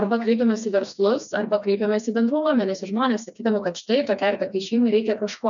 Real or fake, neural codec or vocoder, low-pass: fake; codec, 16 kHz, 2 kbps, FreqCodec, smaller model; 7.2 kHz